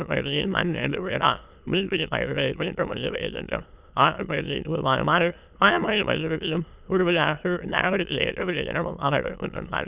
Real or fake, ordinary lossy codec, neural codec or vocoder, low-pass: fake; Opus, 64 kbps; autoencoder, 22.05 kHz, a latent of 192 numbers a frame, VITS, trained on many speakers; 3.6 kHz